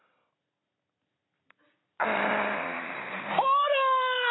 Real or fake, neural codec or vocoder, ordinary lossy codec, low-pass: real; none; AAC, 16 kbps; 7.2 kHz